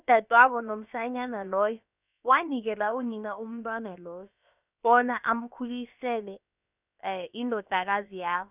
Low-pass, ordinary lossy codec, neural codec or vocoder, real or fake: 3.6 kHz; none; codec, 16 kHz, about 1 kbps, DyCAST, with the encoder's durations; fake